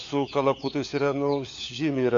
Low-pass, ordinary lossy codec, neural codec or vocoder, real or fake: 7.2 kHz; AAC, 48 kbps; codec, 16 kHz, 4 kbps, FunCodec, trained on LibriTTS, 50 frames a second; fake